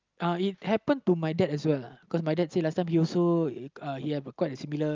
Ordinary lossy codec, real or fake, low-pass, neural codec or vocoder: Opus, 24 kbps; real; 7.2 kHz; none